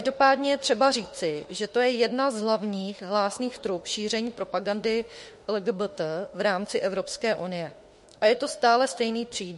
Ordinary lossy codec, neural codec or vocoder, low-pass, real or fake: MP3, 48 kbps; autoencoder, 48 kHz, 32 numbers a frame, DAC-VAE, trained on Japanese speech; 14.4 kHz; fake